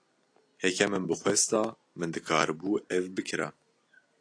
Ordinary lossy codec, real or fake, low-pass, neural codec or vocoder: AAC, 48 kbps; real; 9.9 kHz; none